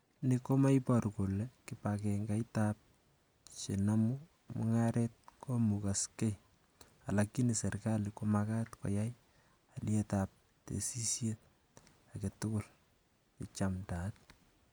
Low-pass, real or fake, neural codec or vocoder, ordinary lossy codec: none; real; none; none